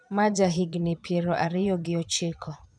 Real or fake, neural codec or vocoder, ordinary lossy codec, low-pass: fake; vocoder, 22.05 kHz, 80 mel bands, Vocos; none; 9.9 kHz